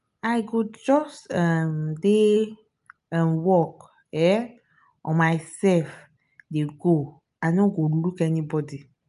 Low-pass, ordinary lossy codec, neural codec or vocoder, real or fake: 10.8 kHz; none; none; real